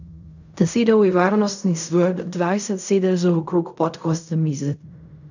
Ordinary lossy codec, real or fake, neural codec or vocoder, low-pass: none; fake; codec, 16 kHz in and 24 kHz out, 0.4 kbps, LongCat-Audio-Codec, fine tuned four codebook decoder; 7.2 kHz